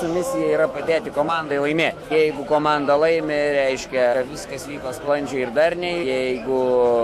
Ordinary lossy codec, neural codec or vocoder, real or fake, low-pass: AAC, 96 kbps; autoencoder, 48 kHz, 128 numbers a frame, DAC-VAE, trained on Japanese speech; fake; 14.4 kHz